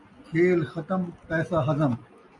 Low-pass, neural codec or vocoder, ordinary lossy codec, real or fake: 10.8 kHz; none; AAC, 64 kbps; real